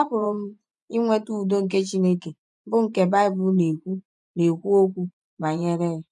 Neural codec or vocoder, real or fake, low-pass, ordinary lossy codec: vocoder, 24 kHz, 100 mel bands, Vocos; fake; none; none